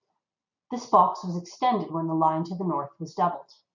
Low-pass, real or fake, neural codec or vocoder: 7.2 kHz; real; none